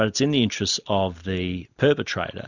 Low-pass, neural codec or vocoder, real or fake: 7.2 kHz; none; real